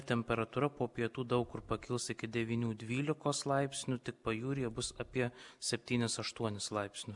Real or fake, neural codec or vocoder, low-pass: real; none; 10.8 kHz